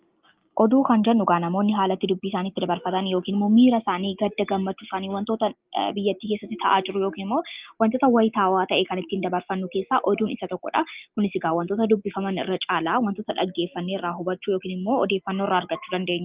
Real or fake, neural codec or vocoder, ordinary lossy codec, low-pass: real; none; Opus, 32 kbps; 3.6 kHz